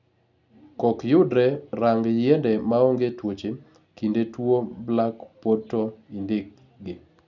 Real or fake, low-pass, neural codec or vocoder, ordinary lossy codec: real; 7.2 kHz; none; none